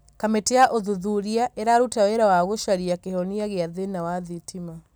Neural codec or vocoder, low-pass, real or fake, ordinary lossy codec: none; none; real; none